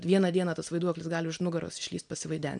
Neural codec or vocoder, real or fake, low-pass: none; real; 9.9 kHz